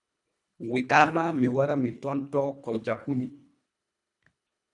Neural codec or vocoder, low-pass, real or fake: codec, 24 kHz, 1.5 kbps, HILCodec; 10.8 kHz; fake